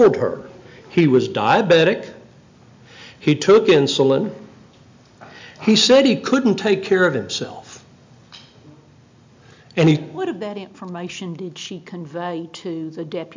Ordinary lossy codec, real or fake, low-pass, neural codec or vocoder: MP3, 64 kbps; real; 7.2 kHz; none